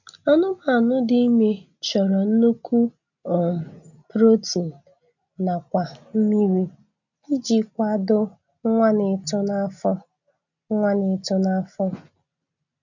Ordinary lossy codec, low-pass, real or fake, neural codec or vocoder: none; 7.2 kHz; real; none